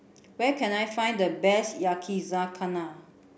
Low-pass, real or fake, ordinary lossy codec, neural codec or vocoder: none; real; none; none